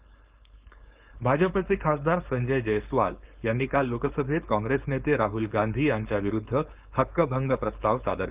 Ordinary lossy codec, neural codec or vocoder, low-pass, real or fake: Opus, 24 kbps; codec, 16 kHz, 4.8 kbps, FACodec; 3.6 kHz; fake